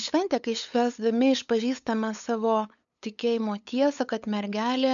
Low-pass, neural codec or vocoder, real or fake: 7.2 kHz; codec, 16 kHz, 8 kbps, FunCodec, trained on LibriTTS, 25 frames a second; fake